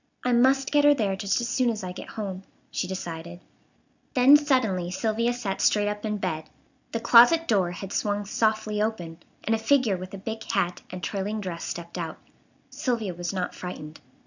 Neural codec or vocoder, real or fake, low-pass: none; real; 7.2 kHz